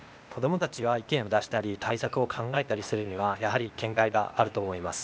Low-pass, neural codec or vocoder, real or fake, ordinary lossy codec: none; codec, 16 kHz, 0.8 kbps, ZipCodec; fake; none